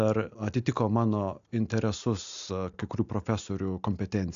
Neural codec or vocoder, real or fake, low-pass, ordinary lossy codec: none; real; 7.2 kHz; MP3, 64 kbps